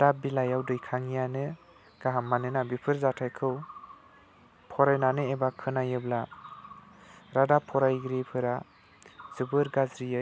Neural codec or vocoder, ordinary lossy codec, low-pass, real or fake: none; none; none; real